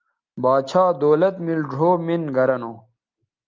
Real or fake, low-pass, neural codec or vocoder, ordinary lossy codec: real; 7.2 kHz; none; Opus, 24 kbps